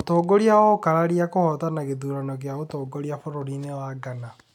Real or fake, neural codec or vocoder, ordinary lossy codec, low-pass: real; none; none; 19.8 kHz